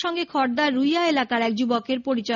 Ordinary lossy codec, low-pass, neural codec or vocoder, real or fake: none; 7.2 kHz; none; real